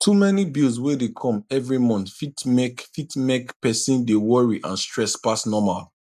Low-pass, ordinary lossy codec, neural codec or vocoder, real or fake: 14.4 kHz; none; none; real